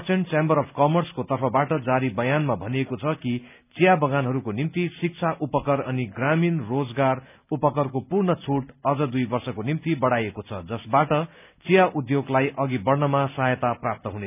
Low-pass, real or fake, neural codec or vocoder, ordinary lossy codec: 3.6 kHz; real; none; none